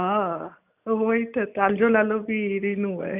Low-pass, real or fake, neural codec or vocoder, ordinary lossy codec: 3.6 kHz; real; none; none